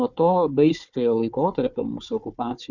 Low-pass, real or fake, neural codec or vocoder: 7.2 kHz; fake; codec, 16 kHz, 4 kbps, FunCodec, trained on Chinese and English, 50 frames a second